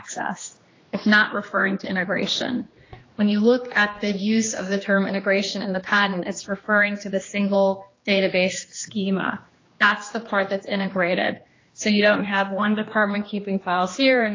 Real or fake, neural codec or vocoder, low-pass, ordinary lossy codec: fake; codec, 16 kHz, 2 kbps, X-Codec, HuBERT features, trained on general audio; 7.2 kHz; AAC, 32 kbps